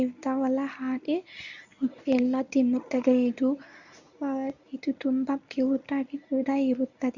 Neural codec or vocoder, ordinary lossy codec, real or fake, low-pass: codec, 24 kHz, 0.9 kbps, WavTokenizer, medium speech release version 1; none; fake; 7.2 kHz